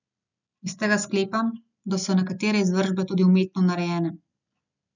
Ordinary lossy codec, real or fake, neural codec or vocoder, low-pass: none; real; none; 7.2 kHz